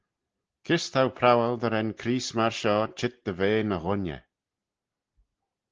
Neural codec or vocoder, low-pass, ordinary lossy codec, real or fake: none; 7.2 kHz; Opus, 16 kbps; real